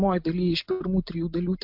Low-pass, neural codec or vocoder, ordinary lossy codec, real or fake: 5.4 kHz; none; MP3, 48 kbps; real